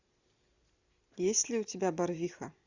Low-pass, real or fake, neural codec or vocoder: 7.2 kHz; real; none